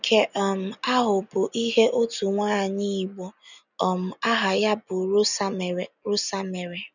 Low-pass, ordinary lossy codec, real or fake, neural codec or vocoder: 7.2 kHz; none; real; none